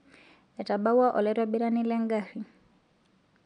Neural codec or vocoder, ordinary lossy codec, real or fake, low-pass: none; none; real; 9.9 kHz